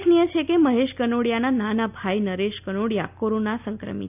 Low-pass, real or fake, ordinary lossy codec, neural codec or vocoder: 3.6 kHz; real; none; none